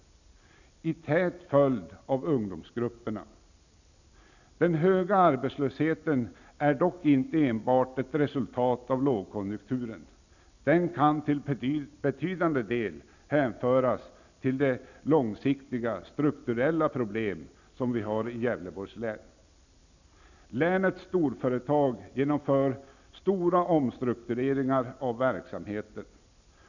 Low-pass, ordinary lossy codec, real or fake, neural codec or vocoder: 7.2 kHz; none; real; none